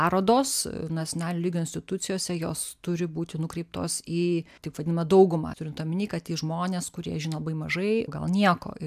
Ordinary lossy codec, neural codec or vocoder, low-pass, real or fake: AAC, 96 kbps; none; 14.4 kHz; real